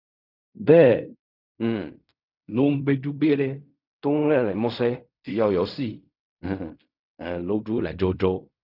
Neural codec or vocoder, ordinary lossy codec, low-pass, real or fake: codec, 16 kHz in and 24 kHz out, 0.4 kbps, LongCat-Audio-Codec, fine tuned four codebook decoder; none; 5.4 kHz; fake